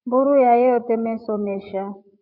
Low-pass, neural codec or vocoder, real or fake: 5.4 kHz; codec, 16 kHz, 16 kbps, FreqCodec, larger model; fake